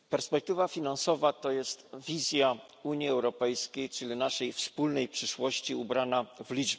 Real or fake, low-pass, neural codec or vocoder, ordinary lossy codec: real; none; none; none